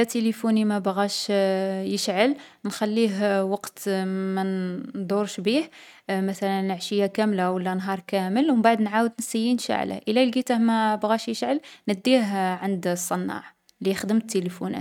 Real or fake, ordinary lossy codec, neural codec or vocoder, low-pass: real; none; none; 19.8 kHz